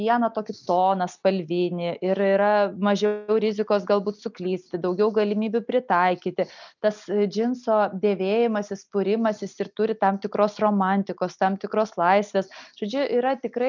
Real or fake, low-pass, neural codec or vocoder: real; 7.2 kHz; none